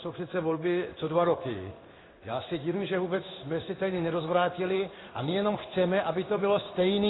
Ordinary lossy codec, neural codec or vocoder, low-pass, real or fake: AAC, 16 kbps; codec, 16 kHz in and 24 kHz out, 1 kbps, XY-Tokenizer; 7.2 kHz; fake